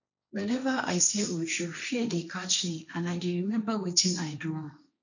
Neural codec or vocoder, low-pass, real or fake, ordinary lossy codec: codec, 16 kHz, 1.1 kbps, Voila-Tokenizer; 7.2 kHz; fake; none